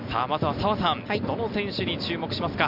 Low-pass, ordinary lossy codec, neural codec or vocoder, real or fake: 5.4 kHz; none; none; real